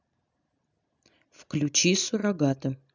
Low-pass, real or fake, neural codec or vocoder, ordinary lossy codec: 7.2 kHz; real; none; none